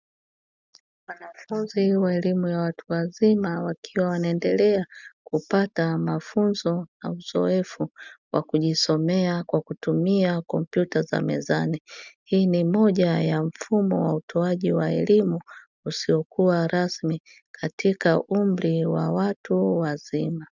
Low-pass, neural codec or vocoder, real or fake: 7.2 kHz; none; real